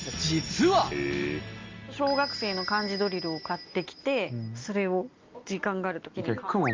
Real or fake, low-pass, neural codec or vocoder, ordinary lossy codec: real; 7.2 kHz; none; Opus, 32 kbps